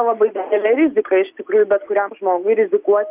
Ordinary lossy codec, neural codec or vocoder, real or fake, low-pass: Opus, 32 kbps; none; real; 3.6 kHz